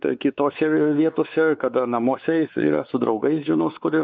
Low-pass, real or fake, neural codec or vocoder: 7.2 kHz; fake; codec, 16 kHz, 4 kbps, X-Codec, WavLM features, trained on Multilingual LibriSpeech